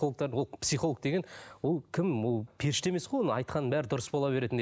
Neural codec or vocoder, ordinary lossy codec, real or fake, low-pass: none; none; real; none